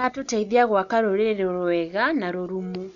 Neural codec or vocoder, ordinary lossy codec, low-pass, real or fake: none; none; 7.2 kHz; real